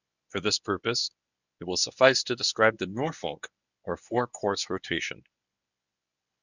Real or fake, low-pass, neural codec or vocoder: fake; 7.2 kHz; codec, 24 kHz, 0.9 kbps, WavTokenizer, medium speech release version 1